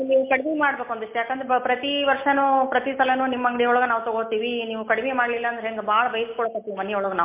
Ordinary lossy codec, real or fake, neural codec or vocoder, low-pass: MP3, 24 kbps; real; none; 3.6 kHz